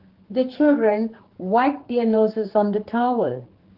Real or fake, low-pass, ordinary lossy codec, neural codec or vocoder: fake; 5.4 kHz; Opus, 16 kbps; codec, 16 kHz, 4 kbps, X-Codec, HuBERT features, trained on general audio